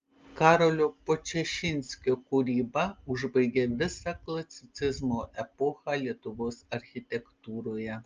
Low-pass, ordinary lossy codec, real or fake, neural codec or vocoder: 7.2 kHz; Opus, 24 kbps; real; none